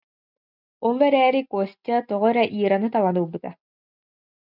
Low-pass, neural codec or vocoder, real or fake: 5.4 kHz; none; real